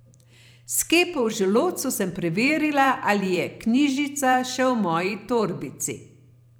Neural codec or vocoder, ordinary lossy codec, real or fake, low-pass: vocoder, 44.1 kHz, 128 mel bands every 512 samples, BigVGAN v2; none; fake; none